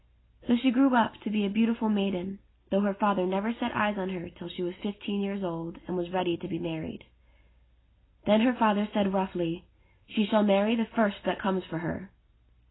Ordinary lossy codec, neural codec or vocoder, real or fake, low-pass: AAC, 16 kbps; none; real; 7.2 kHz